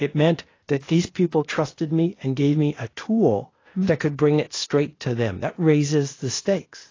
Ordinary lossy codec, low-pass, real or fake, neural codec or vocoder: AAC, 32 kbps; 7.2 kHz; fake; codec, 16 kHz, 0.8 kbps, ZipCodec